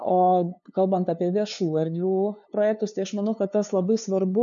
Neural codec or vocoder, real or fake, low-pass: codec, 16 kHz, 2 kbps, FunCodec, trained on LibriTTS, 25 frames a second; fake; 7.2 kHz